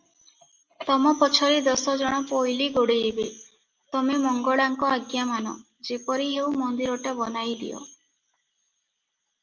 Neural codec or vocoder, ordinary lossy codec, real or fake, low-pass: none; Opus, 24 kbps; real; 7.2 kHz